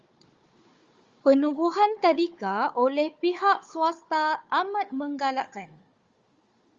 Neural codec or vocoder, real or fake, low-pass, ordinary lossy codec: codec, 16 kHz, 4 kbps, FunCodec, trained on Chinese and English, 50 frames a second; fake; 7.2 kHz; Opus, 32 kbps